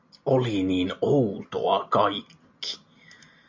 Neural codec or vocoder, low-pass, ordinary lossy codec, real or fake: none; 7.2 kHz; MP3, 48 kbps; real